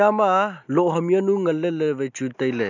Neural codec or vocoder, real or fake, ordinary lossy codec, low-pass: none; real; none; 7.2 kHz